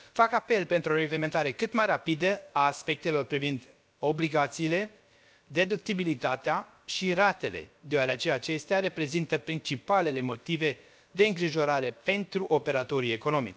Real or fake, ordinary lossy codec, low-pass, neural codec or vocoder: fake; none; none; codec, 16 kHz, about 1 kbps, DyCAST, with the encoder's durations